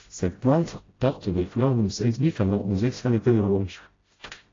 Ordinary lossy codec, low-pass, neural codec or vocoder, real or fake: AAC, 32 kbps; 7.2 kHz; codec, 16 kHz, 0.5 kbps, FreqCodec, smaller model; fake